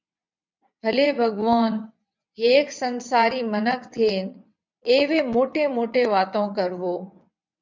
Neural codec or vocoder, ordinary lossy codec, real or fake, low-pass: vocoder, 22.05 kHz, 80 mel bands, WaveNeXt; MP3, 64 kbps; fake; 7.2 kHz